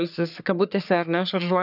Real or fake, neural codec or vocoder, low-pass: fake; codec, 44.1 kHz, 3.4 kbps, Pupu-Codec; 5.4 kHz